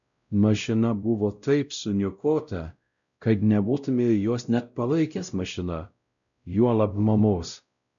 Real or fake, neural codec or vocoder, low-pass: fake; codec, 16 kHz, 0.5 kbps, X-Codec, WavLM features, trained on Multilingual LibriSpeech; 7.2 kHz